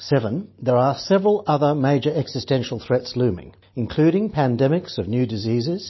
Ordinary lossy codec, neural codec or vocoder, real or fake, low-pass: MP3, 24 kbps; none; real; 7.2 kHz